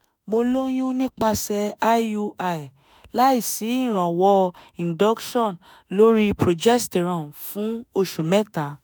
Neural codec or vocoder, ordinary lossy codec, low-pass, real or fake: autoencoder, 48 kHz, 32 numbers a frame, DAC-VAE, trained on Japanese speech; none; none; fake